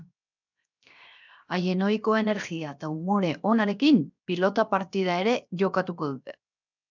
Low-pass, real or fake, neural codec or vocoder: 7.2 kHz; fake; codec, 16 kHz, 0.7 kbps, FocalCodec